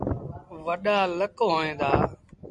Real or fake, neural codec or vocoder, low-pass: real; none; 10.8 kHz